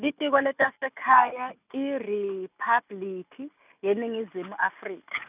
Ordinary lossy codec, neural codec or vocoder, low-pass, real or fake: none; none; 3.6 kHz; real